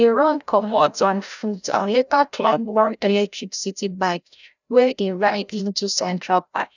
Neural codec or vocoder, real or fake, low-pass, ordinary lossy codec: codec, 16 kHz, 0.5 kbps, FreqCodec, larger model; fake; 7.2 kHz; none